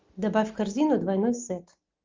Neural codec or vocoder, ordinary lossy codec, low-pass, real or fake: none; Opus, 32 kbps; 7.2 kHz; real